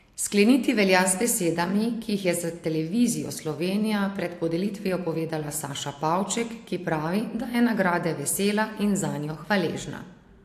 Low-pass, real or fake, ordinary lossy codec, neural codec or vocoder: 14.4 kHz; real; AAC, 64 kbps; none